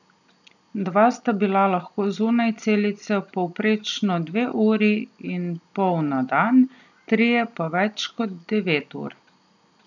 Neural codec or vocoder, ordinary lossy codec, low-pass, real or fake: none; none; 7.2 kHz; real